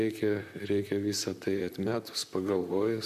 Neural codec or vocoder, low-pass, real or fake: vocoder, 44.1 kHz, 128 mel bands, Pupu-Vocoder; 14.4 kHz; fake